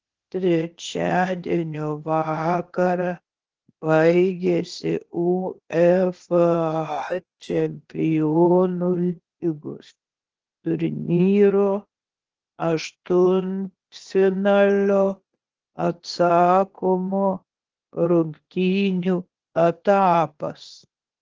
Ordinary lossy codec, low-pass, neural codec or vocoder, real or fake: Opus, 16 kbps; 7.2 kHz; codec, 16 kHz, 0.8 kbps, ZipCodec; fake